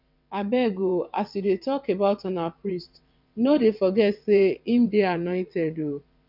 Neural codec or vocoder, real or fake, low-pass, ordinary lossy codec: vocoder, 22.05 kHz, 80 mel bands, WaveNeXt; fake; 5.4 kHz; none